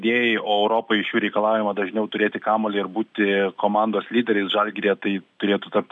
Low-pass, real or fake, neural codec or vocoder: 10.8 kHz; real; none